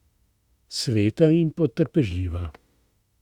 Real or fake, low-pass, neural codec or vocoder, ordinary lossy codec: fake; 19.8 kHz; autoencoder, 48 kHz, 32 numbers a frame, DAC-VAE, trained on Japanese speech; Opus, 64 kbps